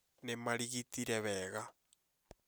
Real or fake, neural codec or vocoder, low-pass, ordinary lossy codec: real; none; none; none